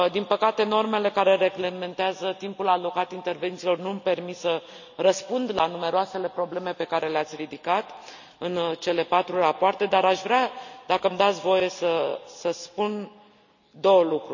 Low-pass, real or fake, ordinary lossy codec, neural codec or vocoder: 7.2 kHz; real; none; none